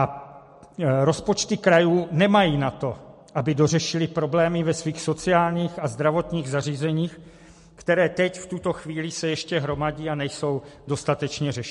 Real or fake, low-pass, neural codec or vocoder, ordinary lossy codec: real; 14.4 kHz; none; MP3, 48 kbps